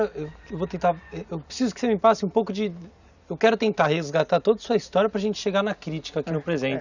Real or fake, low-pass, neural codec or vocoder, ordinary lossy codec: real; 7.2 kHz; none; none